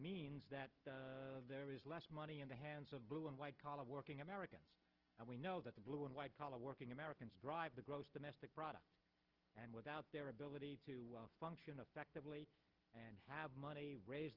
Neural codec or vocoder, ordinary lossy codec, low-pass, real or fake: none; Opus, 16 kbps; 5.4 kHz; real